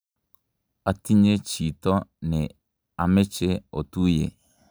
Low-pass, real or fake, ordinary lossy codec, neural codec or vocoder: none; real; none; none